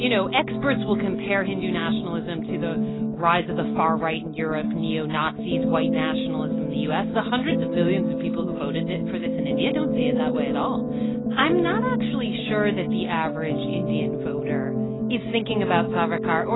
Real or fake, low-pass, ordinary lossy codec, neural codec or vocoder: real; 7.2 kHz; AAC, 16 kbps; none